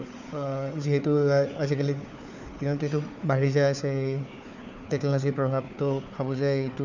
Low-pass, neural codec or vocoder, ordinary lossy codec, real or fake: 7.2 kHz; codec, 16 kHz, 4 kbps, FunCodec, trained on Chinese and English, 50 frames a second; none; fake